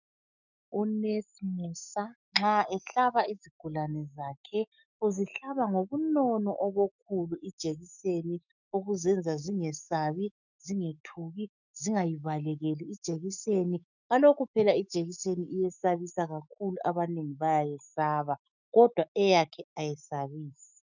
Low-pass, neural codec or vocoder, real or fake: 7.2 kHz; autoencoder, 48 kHz, 128 numbers a frame, DAC-VAE, trained on Japanese speech; fake